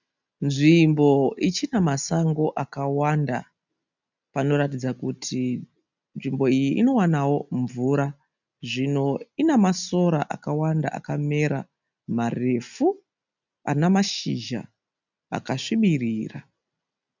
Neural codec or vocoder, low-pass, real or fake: none; 7.2 kHz; real